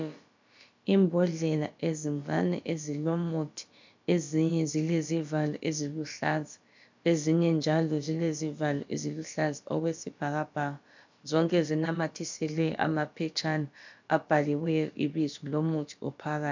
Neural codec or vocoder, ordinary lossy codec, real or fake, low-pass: codec, 16 kHz, about 1 kbps, DyCAST, with the encoder's durations; MP3, 64 kbps; fake; 7.2 kHz